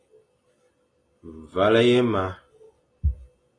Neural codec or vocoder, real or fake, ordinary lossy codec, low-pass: none; real; AAC, 32 kbps; 9.9 kHz